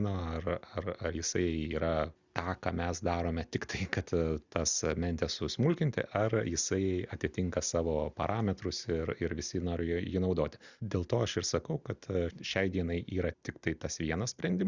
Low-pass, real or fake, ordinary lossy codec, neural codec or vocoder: 7.2 kHz; real; Opus, 64 kbps; none